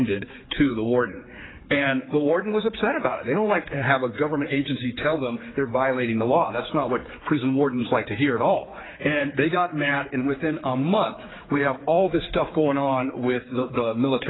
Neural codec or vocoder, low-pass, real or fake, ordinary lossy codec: codec, 16 kHz, 4 kbps, X-Codec, HuBERT features, trained on general audio; 7.2 kHz; fake; AAC, 16 kbps